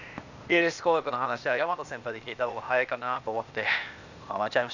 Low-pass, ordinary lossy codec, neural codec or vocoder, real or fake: 7.2 kHz; none; codec, 16 kHz, 0.8 kbps, ZipCodec; fake